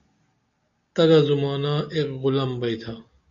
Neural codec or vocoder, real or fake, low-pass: none; real; 7.2 kHz